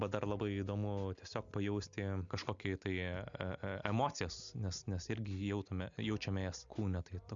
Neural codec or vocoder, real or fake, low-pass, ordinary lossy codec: none; real; 7.2 kHz; MP3, 64 kbps